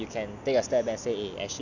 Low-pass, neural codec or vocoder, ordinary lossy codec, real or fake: 7.2 kHz; none; none; real